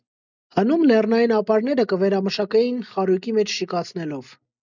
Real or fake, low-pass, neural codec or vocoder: real; 7.2 kHz; none